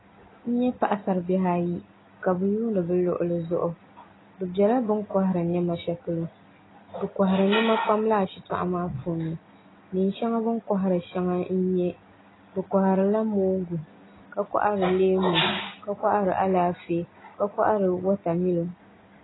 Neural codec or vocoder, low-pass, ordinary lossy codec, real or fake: none; 7.2 kHz; AAC, 16 kbps; real